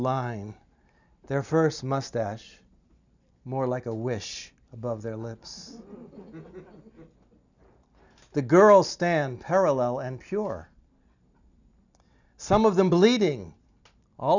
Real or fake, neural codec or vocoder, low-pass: real; none; 7.2 kHz